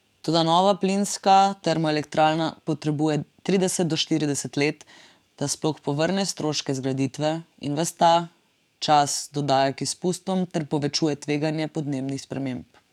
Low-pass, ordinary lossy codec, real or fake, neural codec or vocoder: 19.8 kHz; none; fake; codec, 44.1 kHz, 7.8 kbps, DAC